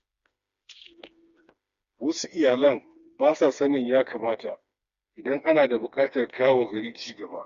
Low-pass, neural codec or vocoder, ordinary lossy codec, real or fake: 7.2 kHz; codec, 16 kHz, 2 kbps, FreqCodec, smaller model; Opus, 64 kbps; fake